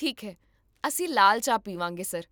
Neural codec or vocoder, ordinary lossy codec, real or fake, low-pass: none; none; real; none